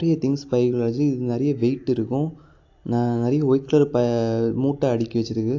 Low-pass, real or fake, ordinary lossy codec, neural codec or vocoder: 7.2 kHz; real; AAC, 48 kbps; none